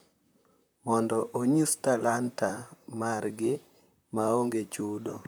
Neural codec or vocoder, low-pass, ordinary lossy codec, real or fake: vocoder, 44.1 kHz, 128 mel bands, Pupu-Vocoder; none; none; fake